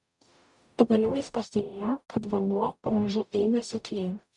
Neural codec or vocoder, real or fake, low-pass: codec, 44.1 kHz, 0.9 kbps, DAC; fake; 10.8 kHz